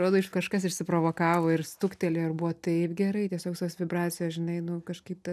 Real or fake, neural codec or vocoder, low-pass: real; none; 14.4 kHz